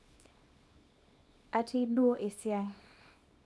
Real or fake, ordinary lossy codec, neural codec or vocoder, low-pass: fake; none; codec, 24 kHz, 0.9 kbps, WavTokenizer, small release; none